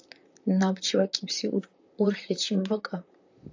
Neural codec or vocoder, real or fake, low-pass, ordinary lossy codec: vocoder, 44.1 kHz, 128 mel bands, Pupu-Vocoder; fake; 7.2 kHz; AAC, 48 kbps